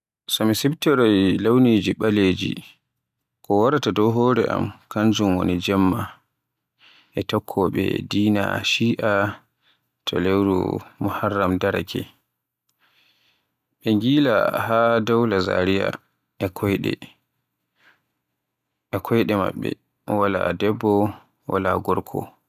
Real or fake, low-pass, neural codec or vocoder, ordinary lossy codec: real; 14.4 kHz; none; none